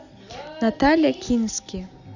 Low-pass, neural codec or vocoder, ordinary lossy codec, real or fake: 7.2 kHz; none; none; real